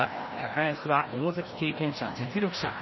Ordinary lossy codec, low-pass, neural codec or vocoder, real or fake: MP3, 24 kbps; 7.2 kHz; codec, 16 kHz, 1 kbps, FreqCodec, larger model; fake